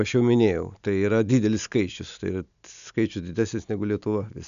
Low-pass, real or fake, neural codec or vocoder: 7.2 kHz; real; none